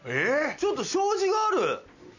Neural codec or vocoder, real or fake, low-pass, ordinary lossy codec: none; real; 7.2 kHz; none